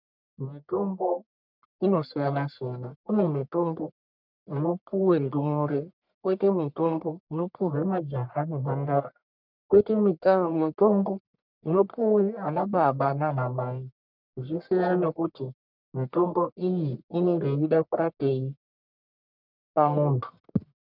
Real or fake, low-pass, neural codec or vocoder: fake; 5.4 kHz; codec, 44.1 kHz, 1.7 kbps, Pupu-Codec